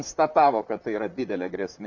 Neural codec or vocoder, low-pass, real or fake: vocoder, 44.1 kHz, 128 mel bands, Pupu-Vocoder; 7.2 kHz; fake